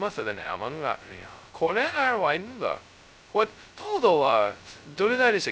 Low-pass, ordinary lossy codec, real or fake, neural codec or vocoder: none; none; fake; codec, 16 kHz, 0.2 kbps, FocalCodec